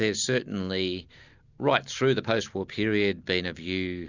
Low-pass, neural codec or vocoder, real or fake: 7.2 kHz; none; real